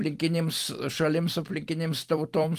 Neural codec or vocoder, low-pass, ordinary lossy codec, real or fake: vocoder, 44.1 kHz, 128 mel bands every 256 samples, BigVGAN v2; 14.4 kHz; Opus, 24 kbps; fake